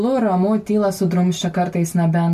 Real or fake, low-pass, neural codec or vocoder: real; 14.4 kHz; none